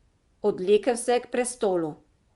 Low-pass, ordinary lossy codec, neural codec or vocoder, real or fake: 10.8 kHz; Opus, 64 kbps; vocoder, 24 kHz, 100 mel bands, Vocos; fake